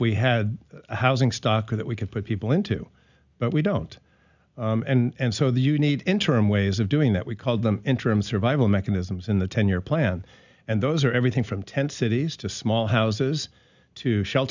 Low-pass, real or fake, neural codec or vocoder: 7.2 kHz; real; none